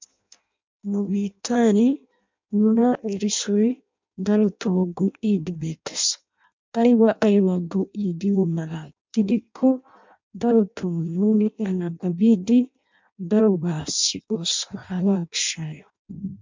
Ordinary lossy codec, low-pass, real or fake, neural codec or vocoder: MP3, 64 kbps; 7.2 kHz; fake; codec, 16 kHz in and 24 kHz out, 0.6 kbps, FireRedTTS-2 codec